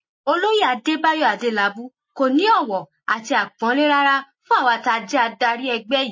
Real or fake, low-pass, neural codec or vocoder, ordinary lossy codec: real; 7.2 kHz; none; MP3, 32 kbps